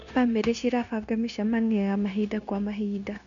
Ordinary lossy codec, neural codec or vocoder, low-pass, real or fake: none; codec, 16 kHz, 0.9 kbps, LongCat-Audio-Codec; 7.2 kHz; fake